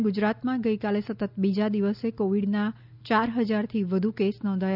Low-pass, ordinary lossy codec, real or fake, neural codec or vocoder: 5.4 kHz; none; real; none